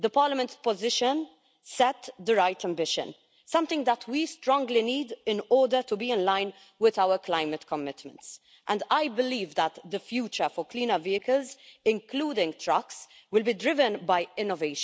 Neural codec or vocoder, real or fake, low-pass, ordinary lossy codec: none; real; none; none